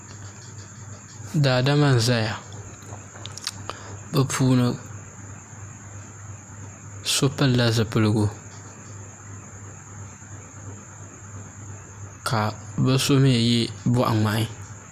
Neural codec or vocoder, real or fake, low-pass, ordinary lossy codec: none; real; 14.4 kHz; MP3, 96 kbps